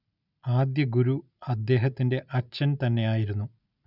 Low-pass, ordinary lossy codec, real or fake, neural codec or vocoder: 5.4 kHz; none; real; none